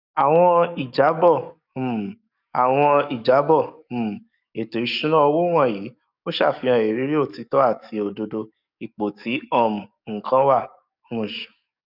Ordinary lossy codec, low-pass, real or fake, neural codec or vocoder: none; 5.4 kHz; fake; codec, 44.1 kHz, 7.8 kbps, DAC